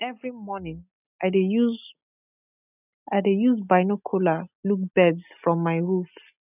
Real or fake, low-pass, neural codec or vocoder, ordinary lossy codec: real; 3.6 kHz; none; none